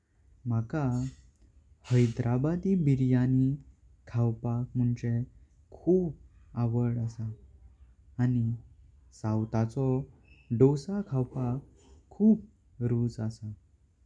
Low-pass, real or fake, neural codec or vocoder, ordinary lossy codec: 9.9 kHz; real; none; none